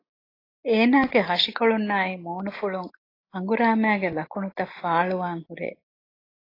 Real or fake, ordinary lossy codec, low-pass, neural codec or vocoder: real; AAC, 24 kbps; 5.4 kHz; none